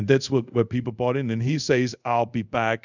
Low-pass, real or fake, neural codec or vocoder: 7.2 kHz; fake; codec, 24 kHz, 0.5 kbps, DualCodec